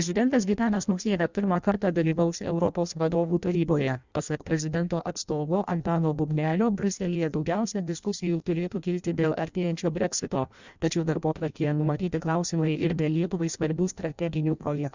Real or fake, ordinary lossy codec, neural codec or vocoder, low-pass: fake; Opus, 64 kbps; codec, 16 kHz in and 24 kHz out, 0.6 kbps, FireRedTTS-2 codec; 7.2 kHz